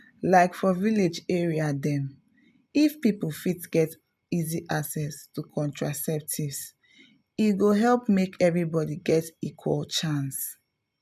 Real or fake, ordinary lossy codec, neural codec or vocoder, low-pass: real; none; none; 14.4 kHz